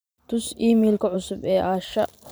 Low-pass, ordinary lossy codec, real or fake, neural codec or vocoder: none; none; fake; vocoder, 44.1 kHz, 128 mel bands every 512 samples, BigVGAN v2